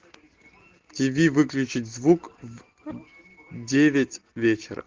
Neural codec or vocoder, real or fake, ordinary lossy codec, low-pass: none; real; Opus, 16 kbps; 7.2 kHz